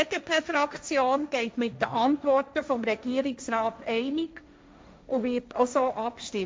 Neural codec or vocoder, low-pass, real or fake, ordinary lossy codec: codec, 16 kHz, 1.1 kbps, Voila-Tokenizer; none; fake; none